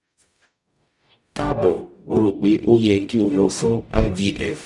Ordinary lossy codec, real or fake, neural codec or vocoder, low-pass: none; fake; codec, 44.1 kHz, 0.9 kbps, DAC; 10.8 kHz